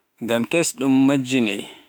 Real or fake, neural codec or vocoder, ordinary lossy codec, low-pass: fake; autoencoder, 48 kHz, 32 numbers a frame, DAC-VAE, trained on Japanese speech; none; none